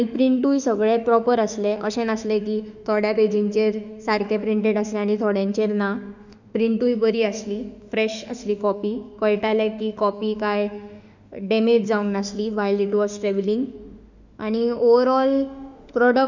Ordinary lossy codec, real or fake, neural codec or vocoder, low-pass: none; fake; autoencoder, 48 kHz, 32 numbers a frame, DAC-VAE, trained on Japanese speech; 7.2 kHz